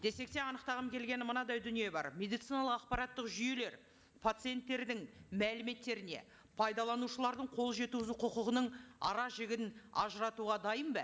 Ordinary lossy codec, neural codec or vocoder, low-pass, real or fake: none; none; none; real